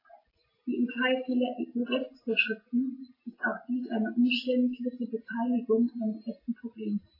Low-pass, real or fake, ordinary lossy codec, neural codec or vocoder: 5.4 kHz; real; AAC, 24 kbps; none